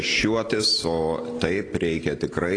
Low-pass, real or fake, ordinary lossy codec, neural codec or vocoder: 9.9 kHz; real; AAC, 32 kbps; none